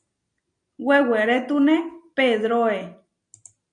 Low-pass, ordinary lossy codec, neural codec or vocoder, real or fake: 9.9 kHz; MP3, 64 kbps; none; real